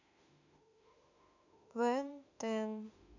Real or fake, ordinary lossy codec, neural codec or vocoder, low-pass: fake; none; autoencoder, 48 kHz, 32 numbers a frame, DAC-VAE, trained on Japanese speech; 7.2 kHz